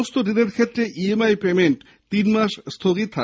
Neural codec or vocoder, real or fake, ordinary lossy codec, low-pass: none; real; none; none